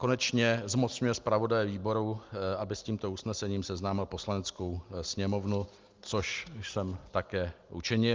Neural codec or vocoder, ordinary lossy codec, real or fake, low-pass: none; Opus, 24 kbps; real; 7.2 kHz